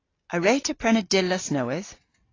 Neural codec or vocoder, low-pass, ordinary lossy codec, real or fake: none; 7.2 kHz; AAC, 32 kbps; real